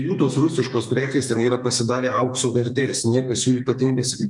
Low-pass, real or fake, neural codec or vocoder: 10.8 kHz; fake; codec, 32 kHz, 1.9 kbps, SNAC